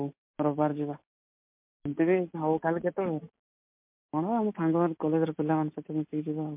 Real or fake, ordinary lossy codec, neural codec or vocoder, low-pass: real; MP3, 32 kbps; none; 3.6 kHz